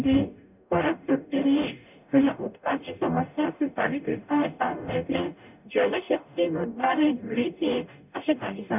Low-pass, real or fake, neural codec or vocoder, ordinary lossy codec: 3.6 kHz; fake; codec, 44.1 kHz, 0.9 kbps, DAC; none